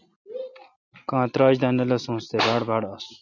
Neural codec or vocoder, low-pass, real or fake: none; 7.2 kHz; real